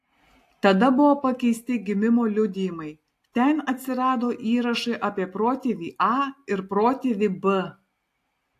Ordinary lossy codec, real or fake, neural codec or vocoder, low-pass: AAC, 64 kbps; real; none; 14.4 kHz